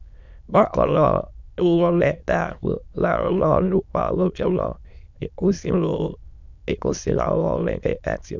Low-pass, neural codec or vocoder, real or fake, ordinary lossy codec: 7.2 kHz; autoencoder, 22.05 kHz, a latent of 192 numbers a frame, VITS, trained on many speakers; fake; none